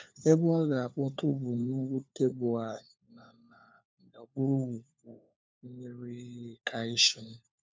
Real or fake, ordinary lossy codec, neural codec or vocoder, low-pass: fake; none; codec, 16 kHz, 4 kbps, FunCodec, trained on LibriTTS, 50 frames a second; none